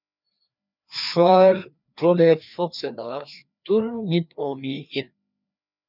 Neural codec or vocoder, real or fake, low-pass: codec, 16 kHz, 2 kbps, FreqCodec, larger model; fake; 5.4 kHz